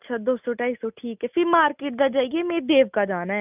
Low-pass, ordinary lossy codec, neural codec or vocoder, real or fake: 3.6 kHz; none; none; real